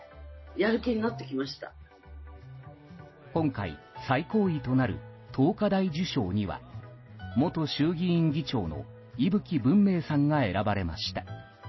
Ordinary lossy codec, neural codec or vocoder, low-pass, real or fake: MP3, 24 kbps; none; 7.2 kHz; real